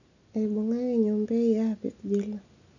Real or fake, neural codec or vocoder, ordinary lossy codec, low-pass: real; none; none; 7.2 kHz